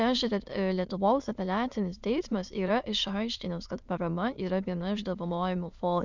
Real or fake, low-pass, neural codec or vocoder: fake; 7.2 kHz; autoencoder, 22.05 kHz, a latent of 192 numbers a frame, VITS, trained on many speakers